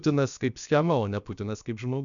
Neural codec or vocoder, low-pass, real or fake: codec, 16 kHz, about 1 kbps, DyCAST, with the encoder's durations; 7.2 kHz; fake